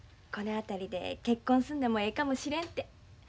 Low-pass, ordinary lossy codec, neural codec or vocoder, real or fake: none; none; none; real